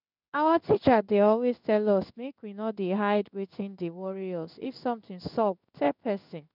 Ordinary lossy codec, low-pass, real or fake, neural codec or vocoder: none; 5.4 kHz; fake; codec, 16 kHz in and 24 kHz out, 1 kbps, XY-Tokenizer